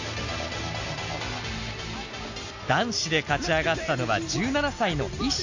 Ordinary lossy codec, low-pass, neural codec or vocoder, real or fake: AAC, 48 kbps; 7.2 kHz; none; real